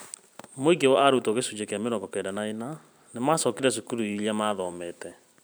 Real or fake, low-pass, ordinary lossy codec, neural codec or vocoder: fake; none; none; vocoder, 44.1 kHz, 128 mel bands every 512 samples, BigVGAN v2